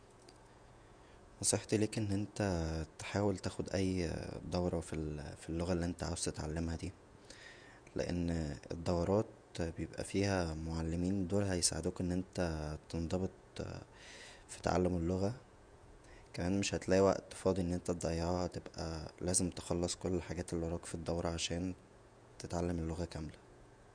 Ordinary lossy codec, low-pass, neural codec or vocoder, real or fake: none; 9.9 kHz; vocoder, 48 kHz, 128 mel bands, Vocos; fake